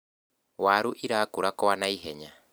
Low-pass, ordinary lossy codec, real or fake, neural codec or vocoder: none; none; real; none